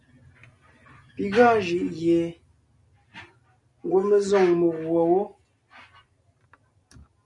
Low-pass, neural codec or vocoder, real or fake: 10.8 kHz; none; real